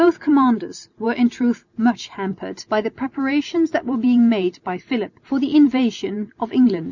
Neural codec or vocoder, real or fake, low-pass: none; real; 7.2 kHz